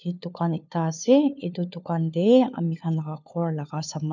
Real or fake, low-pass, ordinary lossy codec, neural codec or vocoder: fake; 7.2 kHz; none; codec, 16 kHz, 4 kbps, FunCodec, trained on LibriTTS, 50 frames a second